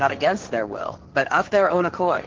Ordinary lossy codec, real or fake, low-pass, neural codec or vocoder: Opus, 16 kbps; fake; 7.2 kHz; codec, 16 kHz, 1.1 kbps, Voila-Tokenizer